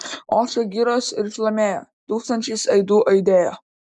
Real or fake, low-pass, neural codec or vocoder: real; 10.8 kHz; none